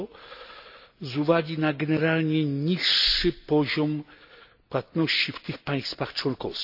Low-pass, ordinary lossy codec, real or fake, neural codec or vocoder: 5.4 kHz; none; real; none